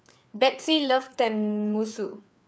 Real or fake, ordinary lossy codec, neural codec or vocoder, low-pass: fake; none; codec, 16 kHz, 4 kbps, FreqCodec, larger model; none